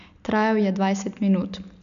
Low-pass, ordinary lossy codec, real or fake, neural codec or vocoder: 7.2 kHz; none; real; none